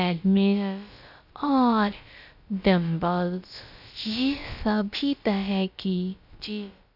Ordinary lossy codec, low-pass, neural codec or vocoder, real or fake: none; 5.4 kHz; codec, 16 kHz, about 1 kbps, DyCAST, with the encoder's durations; fake